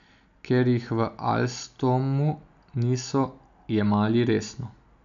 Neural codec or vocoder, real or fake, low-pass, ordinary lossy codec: none; real; 7.2 kHz; MP3, 96 kbps